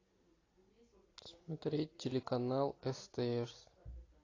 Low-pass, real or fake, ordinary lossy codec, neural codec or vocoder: 7.2 kHz; real; AAC, 32 kbps; none